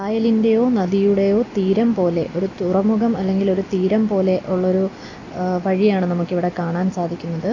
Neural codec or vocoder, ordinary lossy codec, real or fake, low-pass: none; AAC, 32 kbps; real; 7.2 kHz